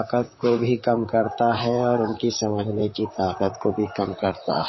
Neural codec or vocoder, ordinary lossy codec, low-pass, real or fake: vocoder, 44.1 kHz, 128 mel bands, Pupu-Vocoder; MP3, 24 kbps; 7.2 kHz; fake